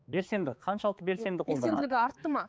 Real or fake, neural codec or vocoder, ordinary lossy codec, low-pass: fake; codec, 16 kHz, 4 kbps, X-Codec, HuBERT features, trained on balanced general audio; none; none